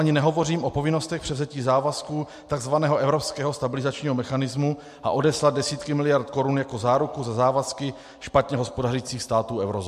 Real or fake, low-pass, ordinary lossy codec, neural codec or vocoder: real; 14.4 kHz; MP3, 64 kbps; none